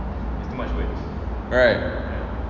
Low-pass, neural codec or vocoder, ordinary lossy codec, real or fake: 7.2 kHz; none; none; real